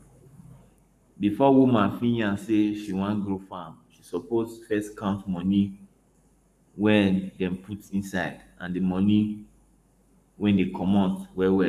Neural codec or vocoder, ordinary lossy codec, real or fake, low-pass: codec, 44.1 kHz, 7.8 kbps, Pupu-Codec; none; fake; 14.4 kHz